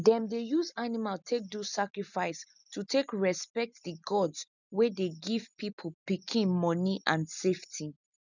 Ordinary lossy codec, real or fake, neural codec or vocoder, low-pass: none; real; none; 7.2 kHz